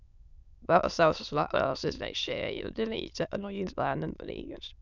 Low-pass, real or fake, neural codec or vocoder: 7.2 kHz; fake; autoencoder, 22.05 kHz, a latent of 192 numbers a frame, VITS, trained on many speakers